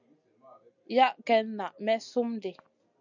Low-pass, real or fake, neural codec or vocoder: 7.2 kHz; real; none